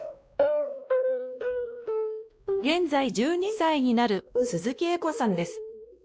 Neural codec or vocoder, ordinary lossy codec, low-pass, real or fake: codec, 16 kHz, 1 kbps, X-Codec, WavLM features, trained on Multilingual LibriSpeech; none; none; fake